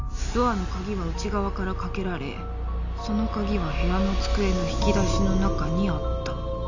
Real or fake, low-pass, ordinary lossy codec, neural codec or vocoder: real; 7.2 kHz; none; none